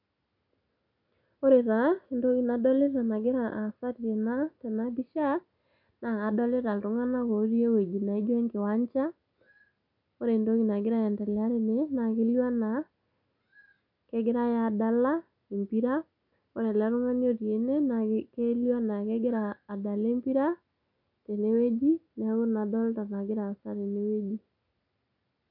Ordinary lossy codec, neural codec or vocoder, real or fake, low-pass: none; none; real; 5.4 kHz